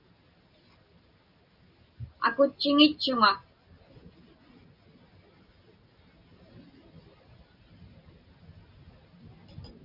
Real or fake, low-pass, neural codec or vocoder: real; 5.4 kHz; none